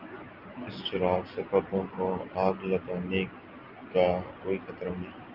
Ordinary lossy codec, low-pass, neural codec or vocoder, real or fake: Opus, 32 kbps; 5.4 kHz; none; real